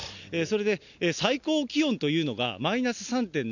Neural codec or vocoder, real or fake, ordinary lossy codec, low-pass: none; real; none; 7.2 kHz